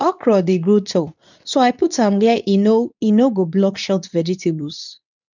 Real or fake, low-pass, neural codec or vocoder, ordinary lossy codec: fake; 7.2 kHz; codec, 24 kHz, 0.9 kbps, WavTokenizer, medium speech release version 2; none